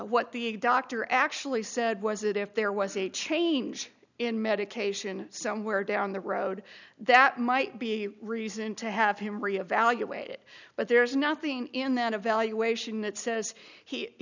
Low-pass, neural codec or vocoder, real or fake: 7.2 kHz; none; real